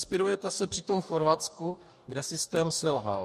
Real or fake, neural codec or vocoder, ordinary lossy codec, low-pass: fake; codec, 44.1 kHz, 2.6 kbps, DAC; MP3, 64 kbps; 14.4 kHz